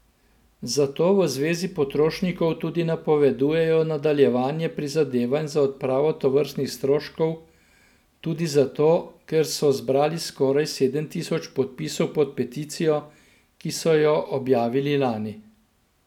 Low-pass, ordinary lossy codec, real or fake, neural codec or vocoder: 19.8 kHz; none; real; none